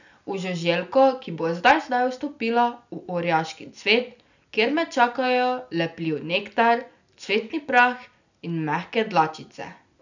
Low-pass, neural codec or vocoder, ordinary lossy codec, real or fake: 7.2 kHz; none; none; real